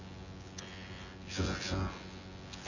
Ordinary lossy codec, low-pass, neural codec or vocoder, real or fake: none; 7.2 kHz; vocoder, 24 kHz, 100 mel bands, Vocos; fake